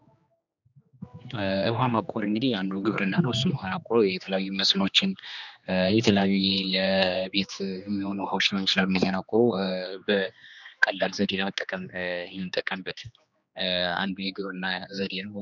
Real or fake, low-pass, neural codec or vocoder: fake; 7.2 kHz; codec, 16 kHz, 2 kbps, X-Codec, HuBERT features, trained on general audio